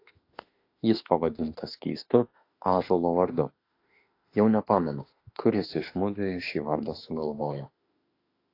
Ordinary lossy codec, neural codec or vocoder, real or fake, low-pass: AAC, 32 kbps; autoencoder, 48 kHz, 32 numbers a frame, DAC-VAE, trained on Japanese speech; fake; 5.4 kHz